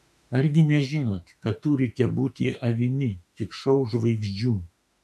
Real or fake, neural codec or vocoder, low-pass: fake; autoencoder, 48 kHz, 32 numbers a frame, DAC-VAE, trained on Japanese speech; 14.4 kHz